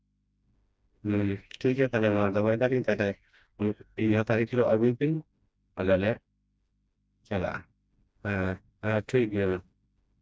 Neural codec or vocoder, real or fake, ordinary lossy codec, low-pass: codec, 16 kHz, 1 kbps, FreqCodec, smaller model; fake; none; none